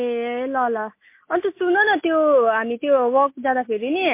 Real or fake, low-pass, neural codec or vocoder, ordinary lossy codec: real; 3.6 kHz; none; MP3, 24 kbps